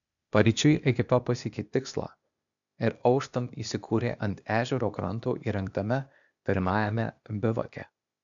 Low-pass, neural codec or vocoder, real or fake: 7.2 kHz; codec, 16 kHz, 0.8 kbps, ZipCodec; fake